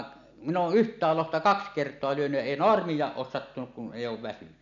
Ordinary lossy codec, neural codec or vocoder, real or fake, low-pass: none; none; real; 7.2 kHz